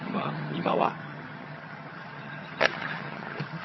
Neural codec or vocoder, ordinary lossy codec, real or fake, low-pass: vocoder, 22.05 kHz, 80 mel bands, HiFi-GAN; MP3, 24 kbps; fake; 7.2 kHz